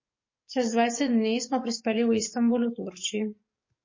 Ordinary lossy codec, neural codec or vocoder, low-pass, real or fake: MP3, 32 kbps; codec, 44.1 kHz, 7.8 kbps, DAC; 7.2 kHz; fake